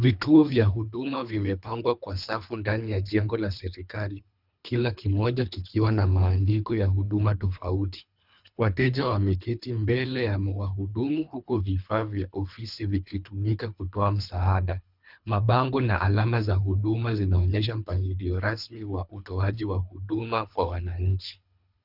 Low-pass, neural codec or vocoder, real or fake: 5.4 kHz; codec, 24 kHz, 3 kbps, HILCodec; fake